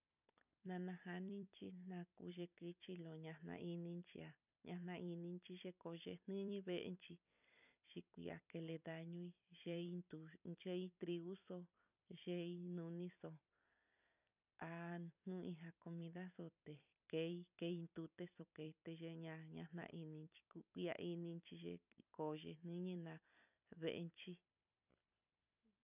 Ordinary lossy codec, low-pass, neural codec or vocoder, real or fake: none; 3.6 kHz; vocoder, 44.1 kHz, 128 mel bands every 256 samples, BigVGAN v2; fake